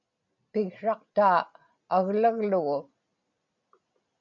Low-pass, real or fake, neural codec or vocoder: 7.2 kHz; real; none